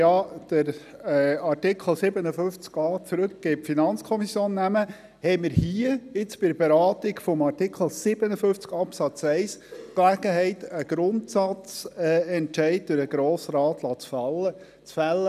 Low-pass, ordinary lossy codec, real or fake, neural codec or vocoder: 14.4 kHz; none; real; none